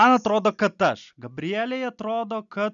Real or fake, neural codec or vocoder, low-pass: real; none; 7.2 kHz